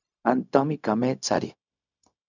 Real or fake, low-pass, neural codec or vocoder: fake; 7.2 kHz; codec, 16 kHz, 0.4 kbps, LongCat-Audio-Codec